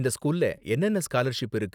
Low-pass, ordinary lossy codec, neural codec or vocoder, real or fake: 19.8 kHz; none; none; real